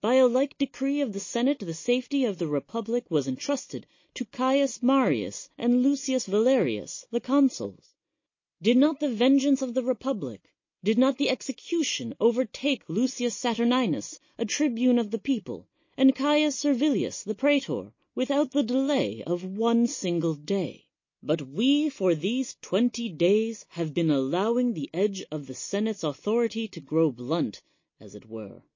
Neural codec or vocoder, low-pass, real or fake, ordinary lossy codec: none; 7.2 kHz; real; MP3, 32 kbps